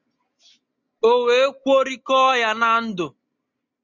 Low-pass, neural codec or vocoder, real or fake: 7.2 kHz; none; real